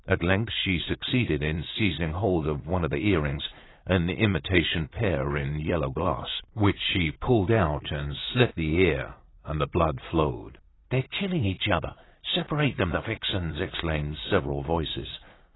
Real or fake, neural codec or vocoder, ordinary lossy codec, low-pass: fake; vocoder, 44.1 kHz, 80 mel bands, Vocos; AAC, 16 kbps; 7.2 kHz